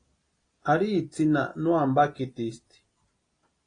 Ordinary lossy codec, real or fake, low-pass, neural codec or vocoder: AAC, 32 kbps; real; 9.9 kHz; none